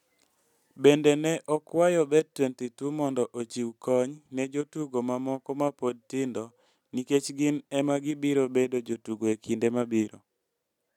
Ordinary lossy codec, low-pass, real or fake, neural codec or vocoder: none; 19.8 kHz; real; none